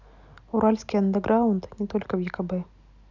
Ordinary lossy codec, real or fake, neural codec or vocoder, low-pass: none; real; none; 7.2 kHz